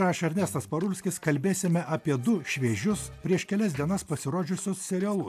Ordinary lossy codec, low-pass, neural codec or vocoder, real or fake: AAC, 96 kbps; 14.4 kHz; none; real